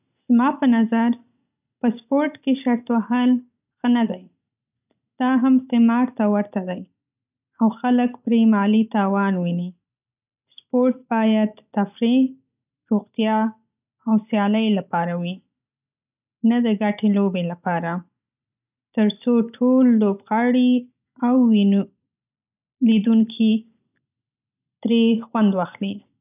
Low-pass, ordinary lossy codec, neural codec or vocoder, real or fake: 3.6 kHz; none; none; real